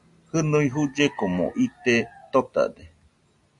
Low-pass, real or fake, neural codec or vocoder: 10.8 kHz; real; none